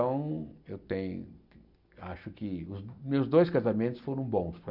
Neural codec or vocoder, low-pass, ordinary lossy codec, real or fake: none; 5.4 kHz; none; real